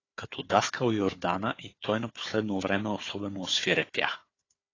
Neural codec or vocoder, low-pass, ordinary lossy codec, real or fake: codec, 16 kHz, 4 kbps, FunCodec, trained on Chinese and English, 50 frames a second; 7.2 kHz; AAC, 32 kbps; fake